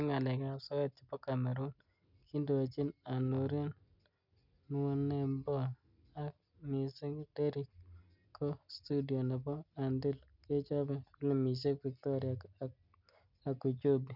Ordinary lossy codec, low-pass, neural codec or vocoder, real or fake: none; 5.4 kHz; none; real